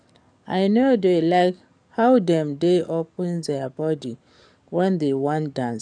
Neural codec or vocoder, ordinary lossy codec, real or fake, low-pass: codec, 44.1 kHz, 7.8 kbps, DAC; none; fake; 9.9 kHz